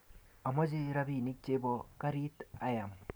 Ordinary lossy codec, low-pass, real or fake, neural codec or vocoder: none; none; real; none